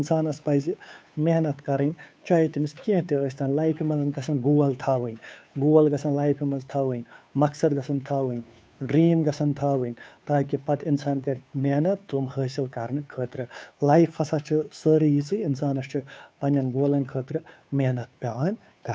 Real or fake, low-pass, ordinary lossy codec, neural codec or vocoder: fake; none; none; codec, 16 kHz, 2 kbps, FunCodec, trained on Chinese and English, 25 frames a second